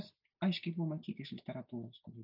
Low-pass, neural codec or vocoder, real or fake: 5.4 kHz; none; real